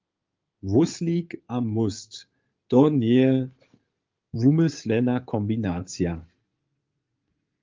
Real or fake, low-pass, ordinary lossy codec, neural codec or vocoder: fake; 7.2 kHz; Opus, 24 kbps; codec, 16 kHz in and 24 kHz out, 2.2 kbps, FireRedTTS-2 codec